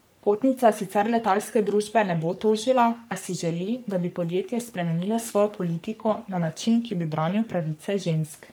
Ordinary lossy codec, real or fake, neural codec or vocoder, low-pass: none; fake; codec, 44.1 kHz, 3.4 kbps, Pupu-Codec; none